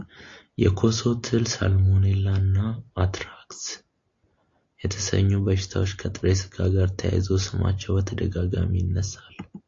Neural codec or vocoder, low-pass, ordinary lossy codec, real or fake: none; 7.2 kHz; AAC, 48 kbps; real